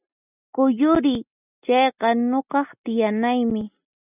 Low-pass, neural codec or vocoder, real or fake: 3.6 kHz; none; real